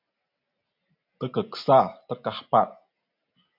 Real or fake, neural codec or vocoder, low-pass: real; none; 5.4 kHz